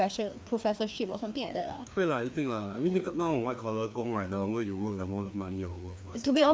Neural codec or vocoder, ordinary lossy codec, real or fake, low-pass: codec, 16 kHz, 2 kbps, FreqCodec, larger model; none; fake; none